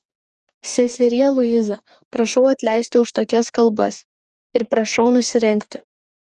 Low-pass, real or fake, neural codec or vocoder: 10.8 kHz; fake; codec, 44.1 kHz, 2.6 kbps, DAC